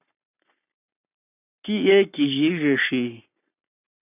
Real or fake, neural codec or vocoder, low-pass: fake; codec, 44.1 kHz, 7.8 kbps, Pupu-Codec; 3.6 kHz